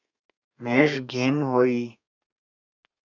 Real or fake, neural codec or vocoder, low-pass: fake; codec, 32 kHz, 1.9 kbps, SNAC; 7.2 kHz